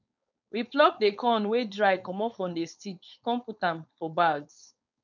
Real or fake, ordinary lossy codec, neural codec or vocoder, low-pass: fake; none; codec, 16 kHz, 4.8 kbps, FACodec; 7.2 kHz